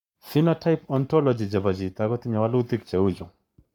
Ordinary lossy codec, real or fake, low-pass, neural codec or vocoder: none; fake; 19.8 kHz; codec, 44.1 kHz, 7.8 kbps, Pupu-Codec